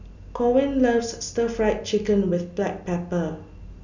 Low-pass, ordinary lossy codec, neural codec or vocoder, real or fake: 7.2 kHz; none; none; real